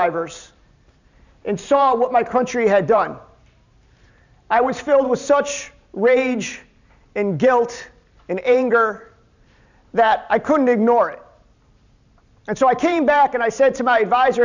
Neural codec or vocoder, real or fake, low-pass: vocoder, 44.1 kHz, 128 mel bands every 512 samples, BigVGAN v2; fake; 7.2 kHz